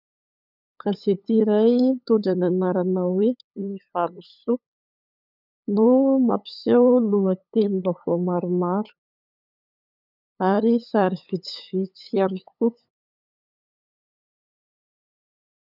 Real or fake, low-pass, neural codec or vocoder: fake; 5.4 kHz; codec, 16 kHz, 8 kbps, FunCodec, trained on LibriTTS, 25 frames a second